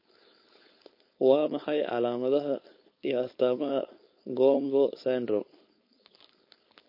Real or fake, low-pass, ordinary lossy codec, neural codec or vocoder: fake; 5.4 kHz; MP3, 32 kbps; codec, 16 kHz, 4.8 kbps, FACodec